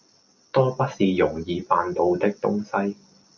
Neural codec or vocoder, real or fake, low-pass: none; real; 7.2 kHz